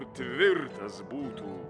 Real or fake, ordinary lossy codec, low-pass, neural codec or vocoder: real; MP3, 96 kbps; 9.9 kHz; none